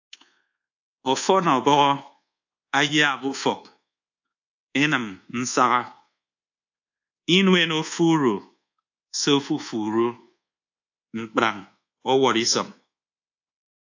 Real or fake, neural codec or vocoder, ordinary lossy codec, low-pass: fake; codec, 24 kHz, 1.2 kbps, DualCodec; AAC, 48 kbps; 7.2 kHz